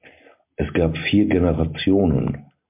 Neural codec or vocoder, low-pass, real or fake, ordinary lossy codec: none; 3.6 kHz; real; MP3, 32 kbps